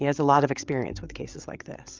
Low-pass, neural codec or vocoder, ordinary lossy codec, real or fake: 7.2 kHz; codec, 16 kHz, 8 kbps, FreqCodec, larger model; Opus, 24 kbps; fake